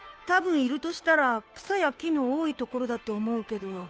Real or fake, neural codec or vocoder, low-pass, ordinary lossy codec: fake; codec, 16 kHz, 2 kbps, FunCodec, trained on Chinese and English, 25 frames a second; none; none